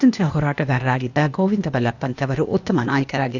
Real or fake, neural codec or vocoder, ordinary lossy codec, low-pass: fake; codec, 16 kHz, 0.8 kbps, ZipCodec; none; 7.2 kHz